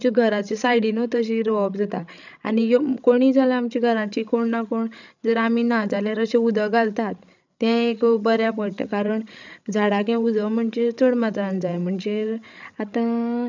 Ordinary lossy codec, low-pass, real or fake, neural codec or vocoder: none; 7.2 kHz; fake; codec, 16 kHz, 16 kbps, FreqCodec, larger model